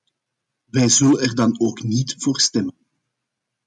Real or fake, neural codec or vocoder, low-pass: real; none; 10.8 kHz